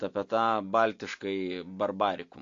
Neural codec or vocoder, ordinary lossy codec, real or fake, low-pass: none; AAC, 48 kbps; real; 7.2 kHz